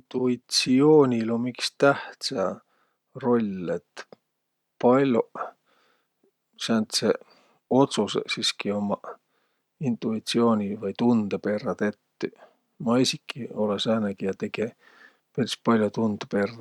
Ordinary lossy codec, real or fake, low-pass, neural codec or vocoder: none; real; 19.8 kHz; none